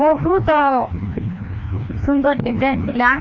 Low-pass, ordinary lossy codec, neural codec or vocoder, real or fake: 7.2 kHz; none; codec, 16 kHz, 1 kbps, FreqCodec, larger model; fake